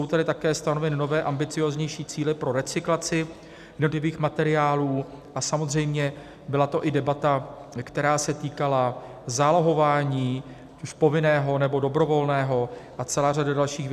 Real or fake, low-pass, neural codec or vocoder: real; 14.4 kHz; none